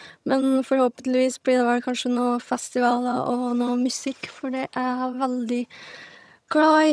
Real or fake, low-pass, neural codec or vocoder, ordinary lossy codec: fake; none; vocoder, 22.05 kHz, 80 mel bands, HiFi-GAN; none